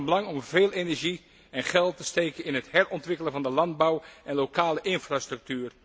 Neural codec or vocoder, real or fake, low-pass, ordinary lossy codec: none; real; none; none